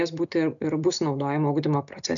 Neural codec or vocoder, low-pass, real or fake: none; 7.2 kHz; real